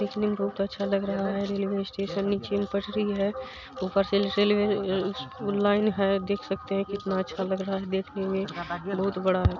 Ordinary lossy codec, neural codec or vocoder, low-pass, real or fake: none; none; 7.2 kHz; real